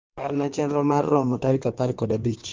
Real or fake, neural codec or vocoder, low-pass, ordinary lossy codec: fake; codec, 16 kHz in and 24 kHz out, 1.1 kbps, FireRedTTS-2 codec; 7.2 kHz; Opus, 32 kbps